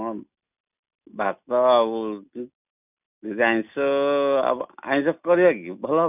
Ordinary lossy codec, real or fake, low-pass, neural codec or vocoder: none; real; 3.6 kHz; none